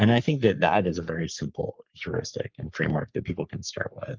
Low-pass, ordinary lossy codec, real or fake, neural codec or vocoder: 7.2 kHz; Opus, 24 kbps; fake; codec, 44.1 kHz, 3.4 kbps, Pupu-Codec